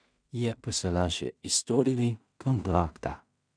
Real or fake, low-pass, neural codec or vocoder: fake; 9.9 kHz; codec, 16 kHz in and 24 kHz out, 0.4 kbps, LongCat-Audio-Codec, two codebook decoder